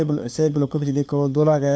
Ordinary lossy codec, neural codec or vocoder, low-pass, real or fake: none; codec, 16 kHz, 2 kbps, FunCodec, trained on LibriTTS, 25 frames a second; none; fake